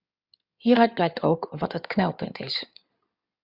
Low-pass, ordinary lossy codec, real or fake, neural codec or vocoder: 5.4 kHz; Opus, 64 kbps; fake; codec, 16 kHz in and 24 kHz out, 2.2 kbps, FireRedTTS-2 codec